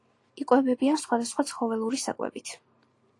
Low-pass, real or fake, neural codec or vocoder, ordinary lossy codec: 10.8 kHz; real; none; AAC, 48 kbps